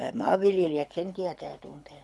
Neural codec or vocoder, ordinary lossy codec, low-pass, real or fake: codec, 24 kHz, 6 kbps, HILCodec; none; none; fake